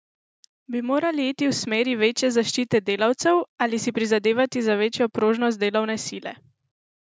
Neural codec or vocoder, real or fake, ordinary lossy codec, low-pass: none; real; none; none